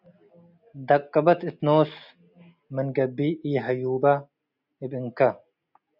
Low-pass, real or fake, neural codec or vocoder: 5.4 kHz; real; none